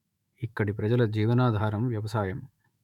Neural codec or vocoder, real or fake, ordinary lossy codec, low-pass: autoencoder, 48 kHz, 128 numbers a frame, DAC-VAE, trained on Japanese speech; fake; MP3, 96 kbps; 19.8 kHz